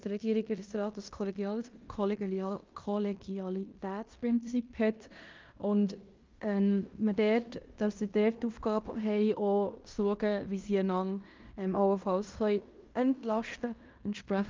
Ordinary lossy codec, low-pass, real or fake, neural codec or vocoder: Opus, 24 kbps; 7.2 kHz; fake; codec, 16 kHz in and 24 kHz out, 0.9 kbps, LongCat-Audio-Codec, fine tuned four codebook decoder